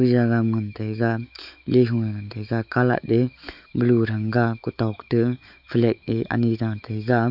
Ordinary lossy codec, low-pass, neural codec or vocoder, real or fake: none; 5.4 kHz; autoencoder, 48 kHz, 128 numbers a frame, DAC-VAE, trained on Japanese speech; fake